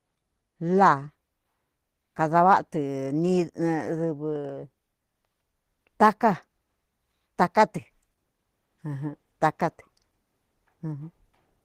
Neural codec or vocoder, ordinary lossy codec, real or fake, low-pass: none; Opus, 16 kbps; real; 14.4 kHz